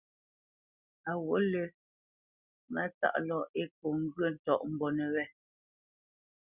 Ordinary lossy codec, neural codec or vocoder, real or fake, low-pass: Opus, 64 kbps; none; real; 3.6 kHz